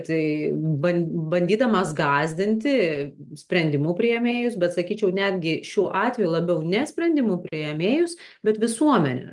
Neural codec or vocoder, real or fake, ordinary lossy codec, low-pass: none; real; Opus, 32 kbps; 10.8 kHz